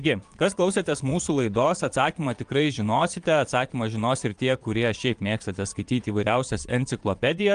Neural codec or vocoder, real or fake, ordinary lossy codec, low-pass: vocoder, 22.05 kHz, 80 mel bands, Vocos; fake; Opus, 32 kbps; 9.9 kHz